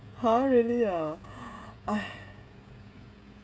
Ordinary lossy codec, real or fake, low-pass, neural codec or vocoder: none; fake; none; codec, 16 kHz, 16 kbps, FreqCodec, smaller model